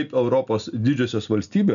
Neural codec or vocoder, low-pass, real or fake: none; 7.2 kHz; real